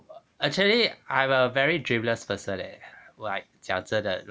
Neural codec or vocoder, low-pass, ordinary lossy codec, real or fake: none; none; none; real